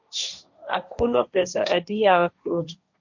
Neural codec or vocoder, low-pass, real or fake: codec, 16 kHz, 1.1 kbps, Voila-Tokenizer; 7.2 kHz; fake